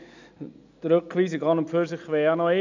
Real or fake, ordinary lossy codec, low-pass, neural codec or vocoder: real; none; 7.2 kHz; none